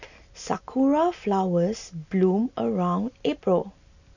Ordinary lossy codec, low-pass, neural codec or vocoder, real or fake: none; 7.2 kHz; none; real